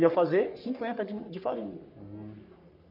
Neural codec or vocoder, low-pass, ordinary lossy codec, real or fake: codec, 44.1 kHz, 3.4 kbps, Pupu-Codec; 5.4 kHz; none; fake